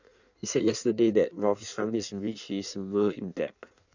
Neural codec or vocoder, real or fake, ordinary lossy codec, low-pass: codec, 16 kHz in and 24 kHz out, 1.1 kbps, FireRedTTS-2 codec; fake; none; 7.2 kHz